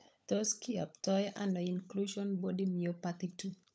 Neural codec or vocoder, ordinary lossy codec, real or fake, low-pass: codec, 16 kHz, 16 kbps, FunCodec, trained on LibriTTS, 50 frames a second; none; fake; none